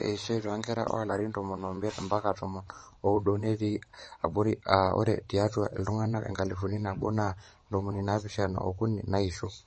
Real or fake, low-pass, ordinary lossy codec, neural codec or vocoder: fake; 9.9 kHz; MP3, 32 kbps; vocoder, 22.05 kHz, 80 mel bands, WaveNeXt